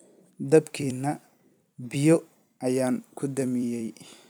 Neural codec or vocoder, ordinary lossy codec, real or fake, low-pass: vocoder, 44.1 kHz, 128 mel bands every 256 samples, BigVGAN v2; none; fake; none